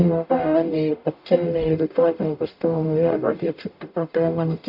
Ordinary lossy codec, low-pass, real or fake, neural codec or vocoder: none; 5.4 kHz; fake; codec, 44.1 kHz, 0.9 kbps, DAC